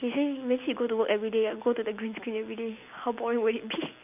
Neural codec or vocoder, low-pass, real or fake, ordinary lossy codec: none; 3.6 kHz; real; none